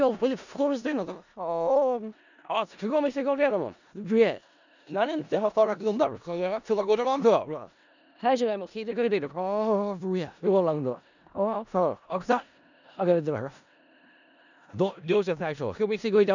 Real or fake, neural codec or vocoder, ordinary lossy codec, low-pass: fake; codec, 16 kHz in and 24 kHz out, 0.4 kbps, LongCat-Audio-Codec, four codebook decoder; none; 7.2 kHz